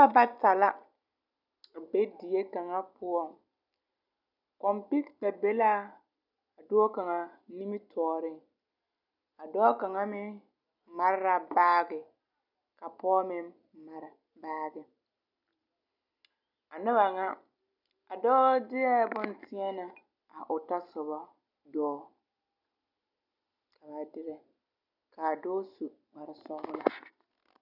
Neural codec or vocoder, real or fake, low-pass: none; real; 5.4 kHz